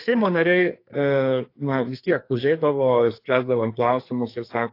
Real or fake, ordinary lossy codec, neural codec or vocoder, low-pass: fake; AAC, 32 kbps; codec, 44.1 kHz, 2.6 kbps, SNAC; 5.4 kHz